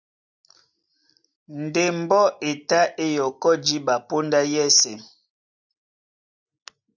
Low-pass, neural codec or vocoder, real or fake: 7.2 kHz; none; real